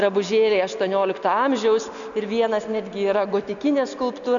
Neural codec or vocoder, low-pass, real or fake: none; 7.2 kHz; real